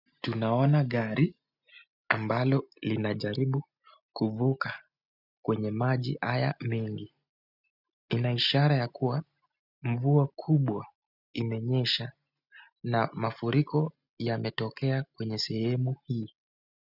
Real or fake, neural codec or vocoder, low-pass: real; none; 5.4 kHz